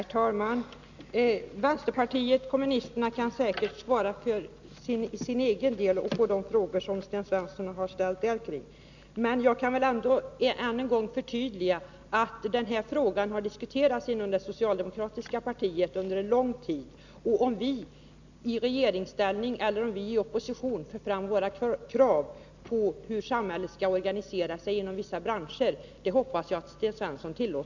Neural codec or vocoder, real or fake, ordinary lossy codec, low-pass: none; real; none; 7.2 kHz